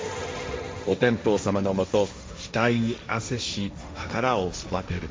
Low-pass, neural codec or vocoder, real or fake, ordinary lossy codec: none; codec, 16 kHz, 1.1 kbps, Voila-Tokenizer; fake; none